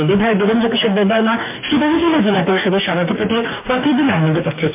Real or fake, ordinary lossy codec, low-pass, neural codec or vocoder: fake; MP3, 24 kbps; 3.6 kHz; codec, 44.1 kHz, 2.6 kbps, DAC